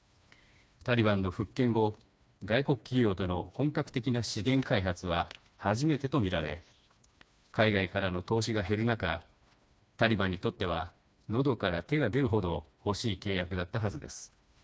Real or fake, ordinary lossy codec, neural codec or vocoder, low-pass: fake; none; codec, 16 kHz, 2 kbps, FreqCodec, smaller model; none